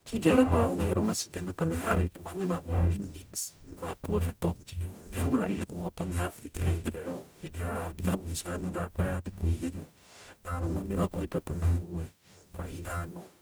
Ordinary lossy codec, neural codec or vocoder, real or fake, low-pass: none; codec, 44.1 kHz, 0.9 kbps, DAC; fake; none